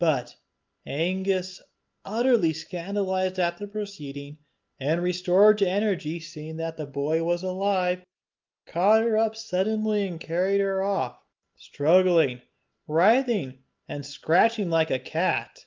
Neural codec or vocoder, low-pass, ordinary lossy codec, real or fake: none; 7.2 kHz; Opus, 32 kbps; real